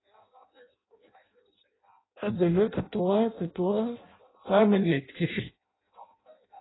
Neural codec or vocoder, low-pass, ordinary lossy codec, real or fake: codec, 16 kHz in and 24 kHz out, 0.6 kbps, FireRedTTS-2 codec; 7.2 kHz; AAC, 16 kbps; fake